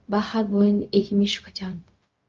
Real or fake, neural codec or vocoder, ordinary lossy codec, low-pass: fake; codec, 16 kHz, 0.4 kbps, LongCat-Audio-Codec; Opus, 24 kbps; 7.2 kHz